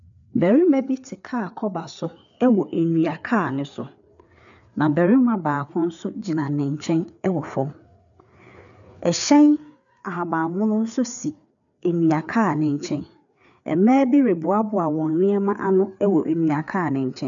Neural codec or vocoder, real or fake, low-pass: codec, 16 kHz, 4 kbps, FreqCodec, larger model; fake; 7.2 kHz